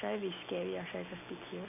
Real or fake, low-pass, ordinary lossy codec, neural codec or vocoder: real; 3.6 kHz; none; none